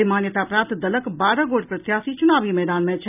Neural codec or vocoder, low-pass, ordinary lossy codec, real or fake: none; 3.6 kHz; none; real